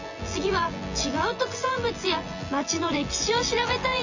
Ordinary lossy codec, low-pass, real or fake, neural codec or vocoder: none; 7.2 kHz; fake; vocoder, 24 kHz, 100 mel bands, Vocos